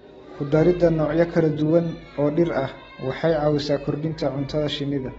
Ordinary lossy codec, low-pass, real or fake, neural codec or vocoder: AAC, 24 kbps; 19.8 kHz; real; none